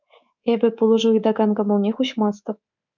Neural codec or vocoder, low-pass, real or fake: codec, 24 kHz, 3.1 kbps, DualCodec; 7.2 kHz; fake